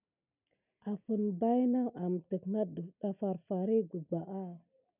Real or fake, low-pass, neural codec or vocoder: real; 3.6 kHz; none